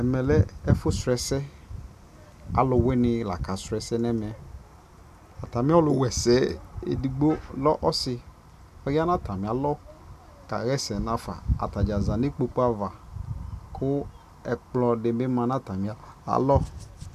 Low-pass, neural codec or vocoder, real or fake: 14.4 kHz; none; real